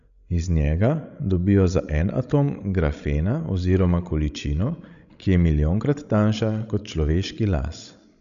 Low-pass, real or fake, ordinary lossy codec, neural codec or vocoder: 7.2 kHz; fake; none; codec, 16 kHz, 16 kbps, FreqCodec, larger model